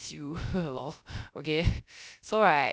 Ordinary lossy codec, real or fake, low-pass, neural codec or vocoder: none; fake; none; codec, 16 kHz, 0.3 kbps, FocalCodec